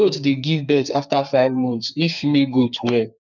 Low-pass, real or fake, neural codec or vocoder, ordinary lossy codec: 7.2 kHz; fake; codec, 32 kHz, 1.9 kbps, SNAC; none